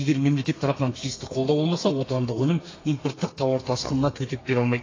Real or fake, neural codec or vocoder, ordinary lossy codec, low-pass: fake; codec, 32 kHz, 1.9 kbps, SNAC; AAC, 32 kbps; 7.2 kHz